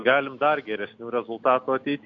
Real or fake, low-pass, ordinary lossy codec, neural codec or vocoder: real; 7.2 kHz; AAC, 48 kbps; none